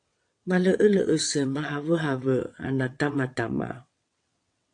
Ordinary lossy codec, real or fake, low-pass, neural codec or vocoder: AAC, 48 kbps; fake; 9.9 kHz; vocoder, 22.05 kHz, 80 mel bands, WaveNeXt